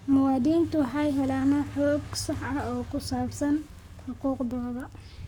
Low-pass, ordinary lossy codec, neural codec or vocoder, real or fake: 19.8 kHz; none; codec, 44.1 kHz, 7.8 kbps, Pupu-Codec; fake